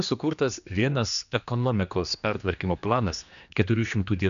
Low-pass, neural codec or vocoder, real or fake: 7.2 kHz; codec, 16 kHz, 2 kbps, X-Codec, HuBERT features, trained on general audio; fake